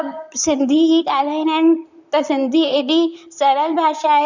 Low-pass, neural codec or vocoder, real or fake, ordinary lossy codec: 7.2 kHz; vocoder, 44.1 kHz, 128 mel bands, Pupu-Vocoder; fake; none